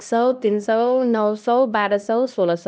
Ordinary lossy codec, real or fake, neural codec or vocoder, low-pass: none; fake; codec, 16 kHz, 1 kbps, X-Codec, HuBERT features, trained on LibriSpeech; none